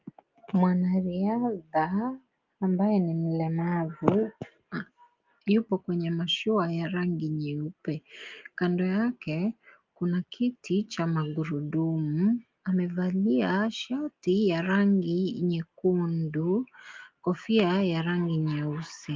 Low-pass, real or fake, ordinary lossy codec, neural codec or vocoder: 7.2 kHz; real; Opus, 32 kbps; none